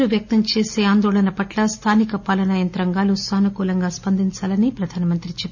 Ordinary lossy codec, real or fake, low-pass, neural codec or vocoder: none; real; 7.2 kHz; none